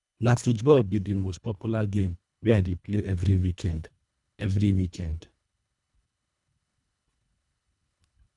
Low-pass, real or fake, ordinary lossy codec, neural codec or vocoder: 10.8 kHz; fake; none; codec, 24 kHz, 1.5 kbps, HILCodec